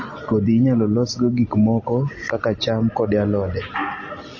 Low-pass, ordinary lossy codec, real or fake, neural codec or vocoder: 7.2 kHz; MP3, 32 kbps; real; none